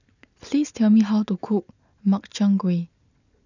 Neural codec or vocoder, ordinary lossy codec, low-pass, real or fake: none; none; 7.2 kHz; real